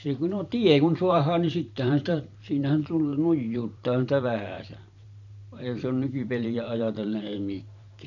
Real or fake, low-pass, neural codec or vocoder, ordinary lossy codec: fake; 7.2 kHz; vocoder, 44.1 kHz, 128 mel bands every 512 samples, BigVGAN v2; none